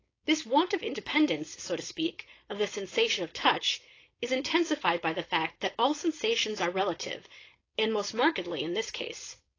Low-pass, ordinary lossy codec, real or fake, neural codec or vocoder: 7.2 kHz; AAC, 32 kbps; fake; codec, 16 kHz, 4.8 kbps, FACodec